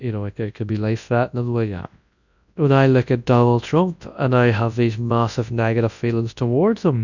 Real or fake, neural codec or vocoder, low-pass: fake; codec, 24 kHz, 0.9 kbps, WavTokenizer, large speech release; 7.2 kHz